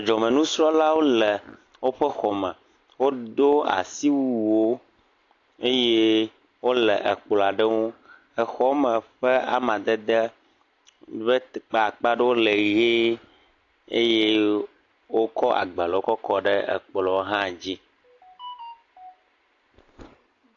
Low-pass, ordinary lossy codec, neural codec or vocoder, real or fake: 7.2 kHz; AAC, 48 kbps; none; real